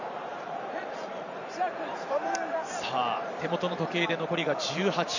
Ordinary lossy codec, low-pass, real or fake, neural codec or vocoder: none; 7.2 kHz; fake; vocoder, 44.1 kHz, 128 mel bands every 512 samples, BigVGAN v2